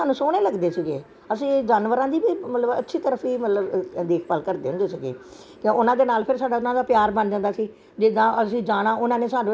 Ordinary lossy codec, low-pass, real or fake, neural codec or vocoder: none; none; real; none